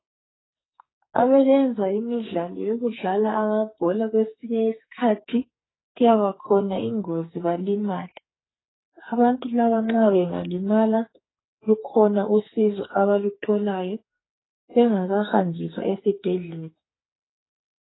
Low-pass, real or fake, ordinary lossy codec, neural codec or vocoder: 7.2 kHz; fake; AAC, 16 kbps; codec, 44.1 kHz, 2.6 kbps, SNAC